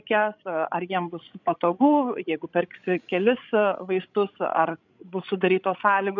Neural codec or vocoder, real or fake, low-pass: codec, 16 kHz, 16 kbps, FreqCodec, larger model; fake; 7.2 kHz